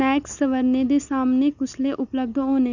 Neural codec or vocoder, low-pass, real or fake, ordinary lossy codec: none; 7.2 kHz; real; none